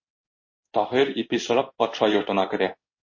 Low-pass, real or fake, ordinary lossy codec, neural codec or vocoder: 7.2 kHz; fake; MP3, 32 kbps; codec, 16 kHz in and 24 kHz out, 1 kbps, XY-Tokenizer